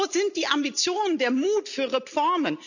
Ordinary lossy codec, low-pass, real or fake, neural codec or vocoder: none; 7.2 kHz; real; none